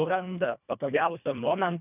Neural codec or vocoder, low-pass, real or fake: codec, 24 kHz, 1.5 kbps, HILCodec; 3.6 kHz; fake